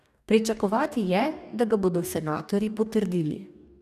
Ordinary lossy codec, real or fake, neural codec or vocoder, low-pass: none; fake; codec, 44.1 kHz, 2.6 kbps, DAC; 14.4 kHz